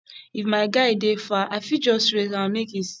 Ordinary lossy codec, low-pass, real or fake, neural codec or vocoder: none; none; real; none